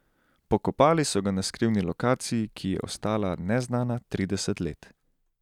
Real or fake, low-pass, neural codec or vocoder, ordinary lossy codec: real; 19.8 kHz; none; none